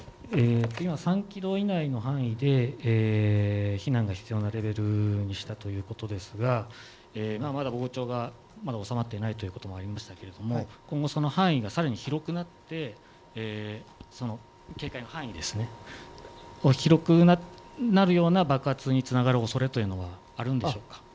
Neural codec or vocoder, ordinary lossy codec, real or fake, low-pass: none; none; real; none